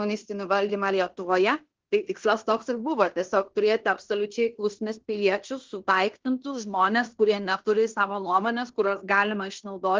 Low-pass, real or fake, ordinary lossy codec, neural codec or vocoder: 7.2 kHz; fake; Opus, 16 kbps; codec, 16 kHz in and 24 kHz out, 0.9 kbps, LongCat-Audio-Codec, fine tuned four codebook decoder